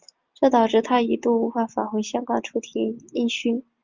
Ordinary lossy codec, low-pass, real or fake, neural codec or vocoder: Opus, 24 kbps; 7.2 kHz; real; none